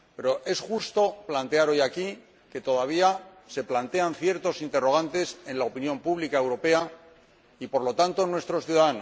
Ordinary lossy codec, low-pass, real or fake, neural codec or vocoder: none; none; real; none